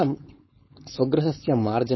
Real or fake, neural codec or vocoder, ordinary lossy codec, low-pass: fake; codec, 16 kHz, 4.8 kbps, FACodec; MP3, 24 kbps; 7.2 kHz